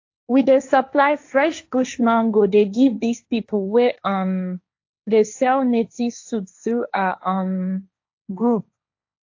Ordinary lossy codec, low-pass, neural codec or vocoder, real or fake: AAC, 48 kbps; 7.2 kHz; codec, 16 kHz, 1.1 kbps, Voila-Tokenizer; fake